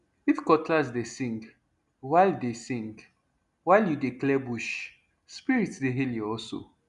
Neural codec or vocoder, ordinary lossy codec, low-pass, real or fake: none; none; 10.8 kHz; real